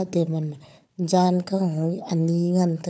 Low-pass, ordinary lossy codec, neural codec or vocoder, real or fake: none; none; codec, 16 kHz, 4 kbps, FunCodec, trained on Chinese and English, 50 frames a second; fake